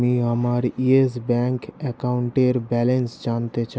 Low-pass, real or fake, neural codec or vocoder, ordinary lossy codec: none; real; none; none